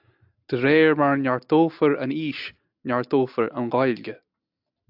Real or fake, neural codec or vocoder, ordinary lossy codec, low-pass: real; none; AAC, 48 kbps; 5.4 kHz